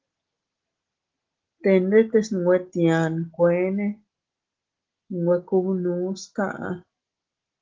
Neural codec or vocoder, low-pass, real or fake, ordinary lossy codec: none; 7.2 kHz; real; Opus, 16 kbps